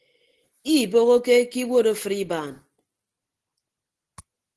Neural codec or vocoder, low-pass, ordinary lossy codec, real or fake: none; 10.8 kHz; Opus, 16 kbps; real